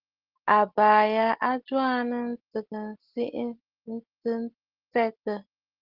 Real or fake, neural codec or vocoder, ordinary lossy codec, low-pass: real; none; Opus, 16 kbps; 5.4 kHz